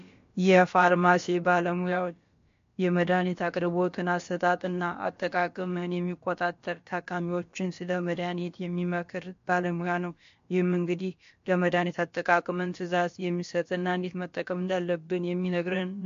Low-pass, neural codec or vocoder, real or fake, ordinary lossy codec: 7.2 kHz; codec, 16 kHz, about 1 kbps, DyCAST, with the encoder's durations; fake; MP3, 48 kbps